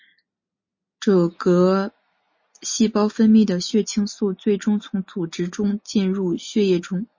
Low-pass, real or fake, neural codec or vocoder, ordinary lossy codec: 7.2 kHz; real; none; MP3, 32 kbps